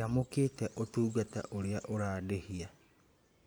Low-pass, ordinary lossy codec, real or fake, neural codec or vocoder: none; none; fake; vocoder, 44.1 kHz, 128 mel bands every 512 samples, BigVGAN v2